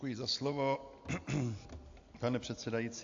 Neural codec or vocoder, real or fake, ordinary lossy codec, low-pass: none; real; AAC, 48 kbps; 7.2 kHz